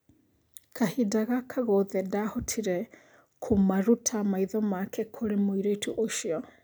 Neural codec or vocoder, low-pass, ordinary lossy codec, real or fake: none; none; none; real